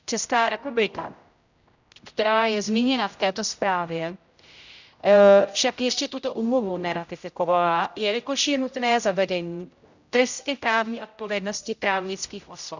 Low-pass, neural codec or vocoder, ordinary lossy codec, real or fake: 7.2 kHz; codec, 16 kHz, 0.5 kbps, X-Codec, HuBERT features, trained on general audio; none; fake